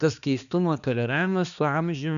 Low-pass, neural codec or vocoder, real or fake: 7.2 kHz; codec, 16 kHz, 2 kbps, X-Codec, HuBERT features, trained on balanced general audio; fake